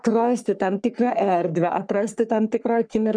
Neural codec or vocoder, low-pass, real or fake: codec, 44.1 kHz, 3.4 kbps, Pupu-Codec; 9.9 kHz; fake